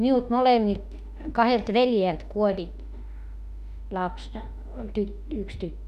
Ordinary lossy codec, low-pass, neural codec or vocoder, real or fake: none; 14.4 kHz; autoencoder, 48 kHz, 32 numbers a frame, DAC-VAE, trained on Japanese speech; fake